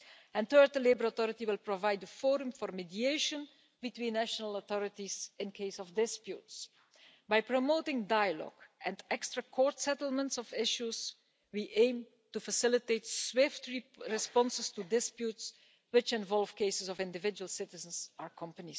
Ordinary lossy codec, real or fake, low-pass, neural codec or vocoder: none; real; none; none